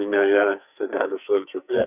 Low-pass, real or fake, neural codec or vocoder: 3.6 kHz; fake; codec, 44.1 kHz, 2.6 kbps, SNAC